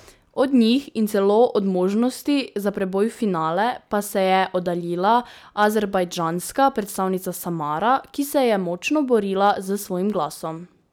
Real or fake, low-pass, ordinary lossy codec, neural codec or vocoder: real; none; none; none